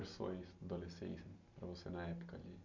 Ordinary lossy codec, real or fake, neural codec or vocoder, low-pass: none; real; none; 7.2 kHz